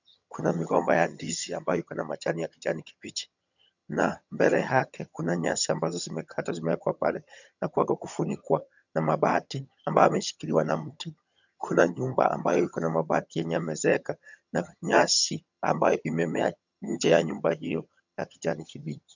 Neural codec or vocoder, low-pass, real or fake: vocoder, 22.05 kHz, 80 mel bands, HiFi-GAN; 7.2 kHz; fake